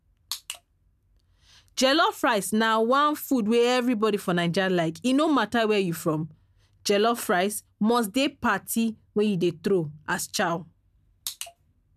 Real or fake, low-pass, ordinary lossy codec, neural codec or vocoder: real; 14.4 kHz; none; none